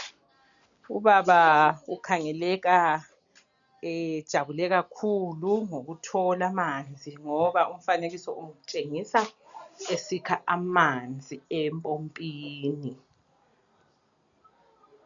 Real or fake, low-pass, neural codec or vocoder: real; 7.2 kHz; none